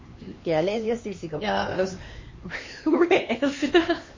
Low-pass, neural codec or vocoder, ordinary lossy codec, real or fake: 7.2 kHz; codec, 16 kHz, 2 kbps, X-Codec, HuBERT features, trained on LibriSpeech; MP3, 32 kbps; fake